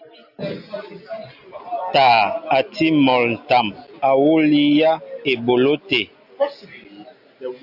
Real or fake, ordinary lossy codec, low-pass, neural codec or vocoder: real; AAC, 48 kbps; 5.4 kHz; none